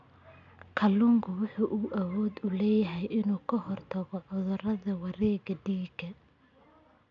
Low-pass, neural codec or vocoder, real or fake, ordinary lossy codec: 7.2 kHz; none; real; none